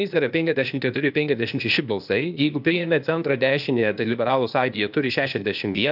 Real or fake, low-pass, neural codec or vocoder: fake; 5.4 kHz; codec, 16 kHz, 0.8 kbps, ZipCodec